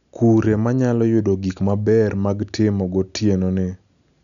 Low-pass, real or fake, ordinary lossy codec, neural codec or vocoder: 7.2 kHz; real; none; none